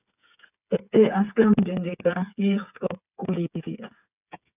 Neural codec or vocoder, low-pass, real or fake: codec, 16 kHz, 8 kbps, FreqCodec, smaller model; 3.6 kHz; fake